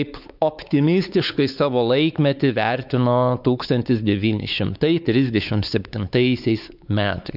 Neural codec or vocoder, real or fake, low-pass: codec, 16 kHz, 4 kbps, X-Codec, WavLM features, trained on Multilingual LibriSpeech; fake; 5.4 kHz